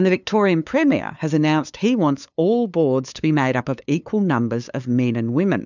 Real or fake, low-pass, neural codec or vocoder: fake; 7.2 kHz; codec, 16 kHz, 2 kbps, FunCodec, trained on LibriTTS, 25 frames a second